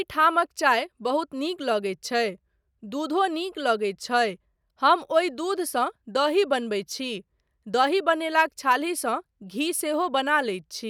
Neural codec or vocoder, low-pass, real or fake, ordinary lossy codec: none; 19.8 kHz; real; none